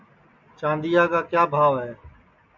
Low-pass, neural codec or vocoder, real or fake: 7.2 kHz; none; real